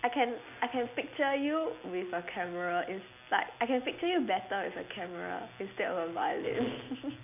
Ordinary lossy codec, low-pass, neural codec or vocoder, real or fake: none; 3.6 kHz; none; real